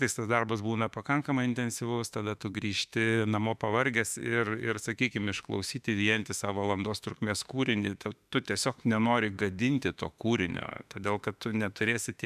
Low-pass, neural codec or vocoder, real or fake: 14.4 kHz; autoencoder, 48 kHz, 32 numbers a frame, DAC-VAE, trained on Japanese speech; fake